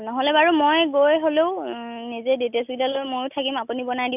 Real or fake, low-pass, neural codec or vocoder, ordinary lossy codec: real; 3.6 kHz; none; none